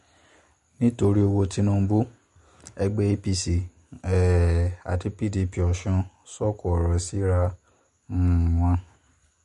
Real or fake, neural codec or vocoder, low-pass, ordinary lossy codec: real; none; 14.4 kHz; MP3, 48 kbps